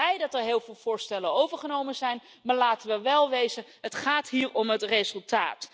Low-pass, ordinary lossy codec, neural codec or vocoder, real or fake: none; none; none; real